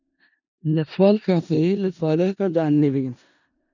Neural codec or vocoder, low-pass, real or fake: codec, 16 kHz in and 24 kHz out, 0.4 kbps, LongCat-Audio-Codec, four codebook decoder; 7.2 kHz; fake